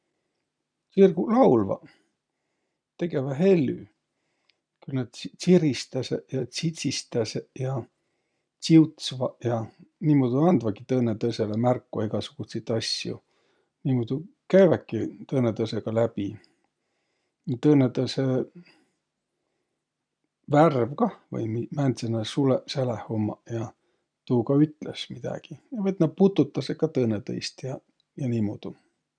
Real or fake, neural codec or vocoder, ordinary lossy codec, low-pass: real; none; none; 9.9 kHz